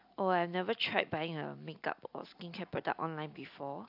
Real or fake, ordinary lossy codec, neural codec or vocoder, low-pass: real; AAC, 48 kbps; none; 5.4 kHz